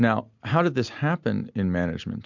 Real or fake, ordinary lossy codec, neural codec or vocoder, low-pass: real; MP3, 64 kbps; none; 7.2 kHz